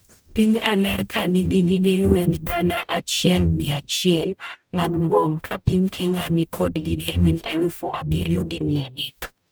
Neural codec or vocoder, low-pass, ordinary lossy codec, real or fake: codec, 44.1 kHz, 0.9 kbps, DAC; none; none; fake